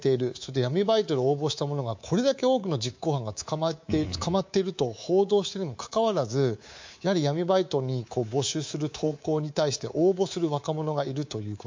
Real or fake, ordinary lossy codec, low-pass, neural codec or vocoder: fake; MP3, 48 kbps; 7.2 kHz; codec, 24 kHz, 3.1 kbps, DualCodec